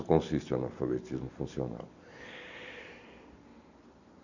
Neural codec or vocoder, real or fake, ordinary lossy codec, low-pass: vocoder, 44.1 kHz, 128 mel bands every 256 samples, BigVGAN v2; fake; none; 7.2 kHz